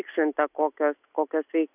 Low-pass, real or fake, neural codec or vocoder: 3.6 kHz; real; none